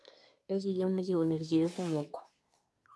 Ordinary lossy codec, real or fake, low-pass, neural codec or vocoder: none; fake; none; codec, 24 kHz, 1 kbps, SNAC